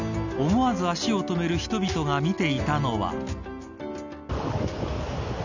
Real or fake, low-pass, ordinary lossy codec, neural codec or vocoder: real; 7.2 kHz; none; none